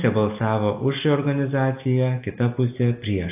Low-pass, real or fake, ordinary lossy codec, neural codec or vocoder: 3.6 kHz; real; AAC, 32 kbps; none